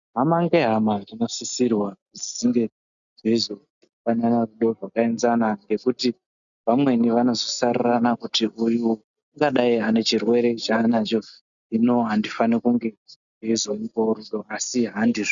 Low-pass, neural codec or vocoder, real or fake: 7.2 kHz; none; real